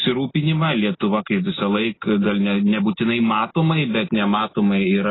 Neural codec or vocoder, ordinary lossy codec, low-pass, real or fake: none; AAC, 16 kbps; 7.2 kHz; real